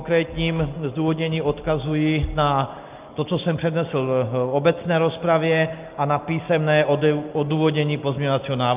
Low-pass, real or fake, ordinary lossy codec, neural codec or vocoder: 3.6 kHz; real; Opus, 32 kbps; none